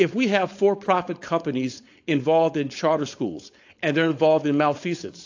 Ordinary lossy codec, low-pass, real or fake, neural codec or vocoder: AAC, 48 kbps; 7.2 kHz; fake; codec, 16 kHz, 4.8 kbps, FACodec